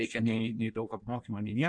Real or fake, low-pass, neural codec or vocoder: fake; 9.9 kHz; codec, 16 kHz in and 24 kHz out, 1.1 kbps, FireRedTTS-2 codec